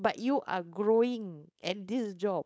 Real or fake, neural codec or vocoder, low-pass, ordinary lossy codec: fake; codec, 16 kHz, 4.8 kbps, FACodec; none; none